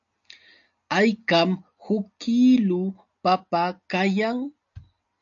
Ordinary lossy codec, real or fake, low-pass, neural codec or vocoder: AAC, 64 kbps; real; 7.2 kHz; none